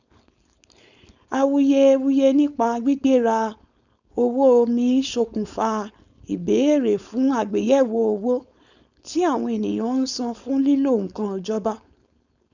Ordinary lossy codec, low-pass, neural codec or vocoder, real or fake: none; 7.2 kHz; codec, 16 kHz, 4.8 kbps, FACodec; fake